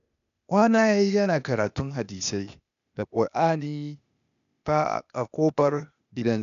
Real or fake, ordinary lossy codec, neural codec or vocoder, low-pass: fake; none; codec, 16 kHz, 0.8 kbps, ZipCodec; 7.2 kHz